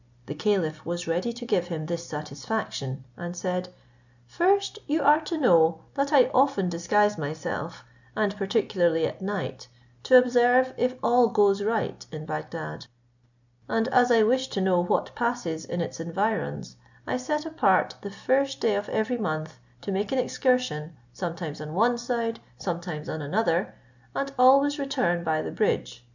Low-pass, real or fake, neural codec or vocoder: 7.2 kHz; real; none